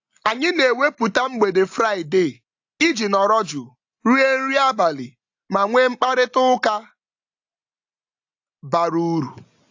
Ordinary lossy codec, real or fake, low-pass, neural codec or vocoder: AAC, 48 kbps; real; 7.2 kHz; none